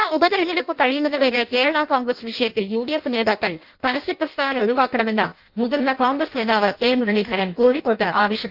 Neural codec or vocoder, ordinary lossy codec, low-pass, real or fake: codec, 16 kHz in and 24 kHz out, 0.6 kbps, FireRedTTS-2 codec; Opus, 24 kbps; 5.4 kHz; fake